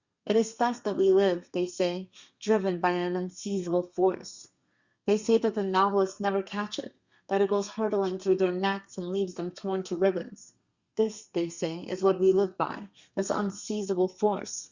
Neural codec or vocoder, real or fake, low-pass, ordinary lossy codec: codec, 32 kHz, 1.9 kbps, SNAC; fake; 7.2 kHz; Opus, 64 kbps